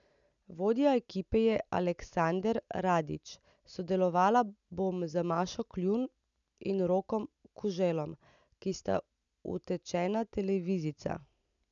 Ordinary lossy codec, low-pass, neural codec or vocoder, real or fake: none; 7.2 kHz; none; real